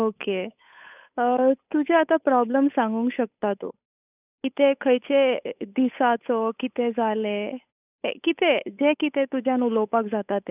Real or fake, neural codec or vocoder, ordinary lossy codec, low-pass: fake; codec, 16 kHz, 8 kbps, FunCodec, trained on Chinese and English, 25 frames a second; none; 3.6 kHz